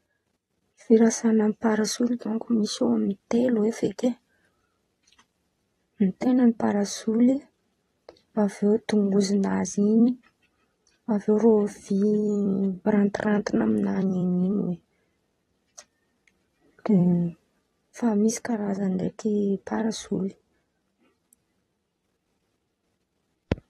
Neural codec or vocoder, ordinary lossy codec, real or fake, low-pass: vocoder, 44.1 kHz, 128 mel bands every 256 samples, BigVGAN v2; AAC, 32 kbps; fake; 19.8 kHz